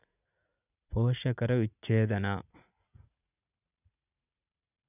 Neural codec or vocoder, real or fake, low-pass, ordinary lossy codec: vocoder, 44.1 kHz, 80 mel bands, Vocos; fake; 3.6 kHz; none